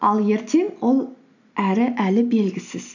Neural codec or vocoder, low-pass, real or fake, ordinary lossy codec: none; 7.2 kHz; real; none